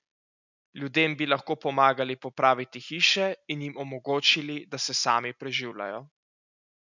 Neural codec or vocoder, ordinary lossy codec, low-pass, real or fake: none; none; 7.2 kHz; real